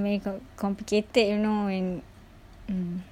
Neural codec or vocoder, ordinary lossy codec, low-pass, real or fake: none; none; 19.8 kHz; real